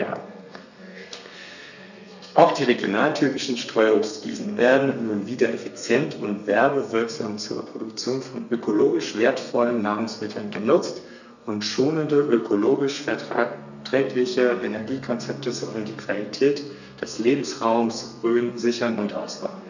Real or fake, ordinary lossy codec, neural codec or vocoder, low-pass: fake; none; codec, 32 kHz, 1.9 kbps, SNAC; 7.2 kHz